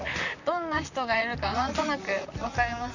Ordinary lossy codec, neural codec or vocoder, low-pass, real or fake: none; vocoder, 44.1 kHz, 128 mel bands, Pupu-Vocoder; 7.2 kHz; fake